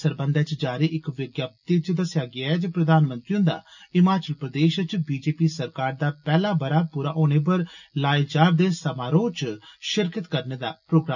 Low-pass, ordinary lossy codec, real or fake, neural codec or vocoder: 7.2 kHz; none; real; none